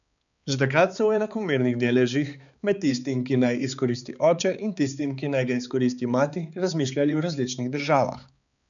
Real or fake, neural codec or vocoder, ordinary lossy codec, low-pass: fake; codec, 16 kHz, 4 kbps, X-Codec, HuBERT features, trained on balanced general audio; none; 7.2 kHz